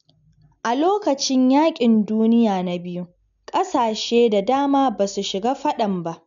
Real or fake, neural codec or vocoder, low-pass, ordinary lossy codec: real; none; 7.2 kHz; none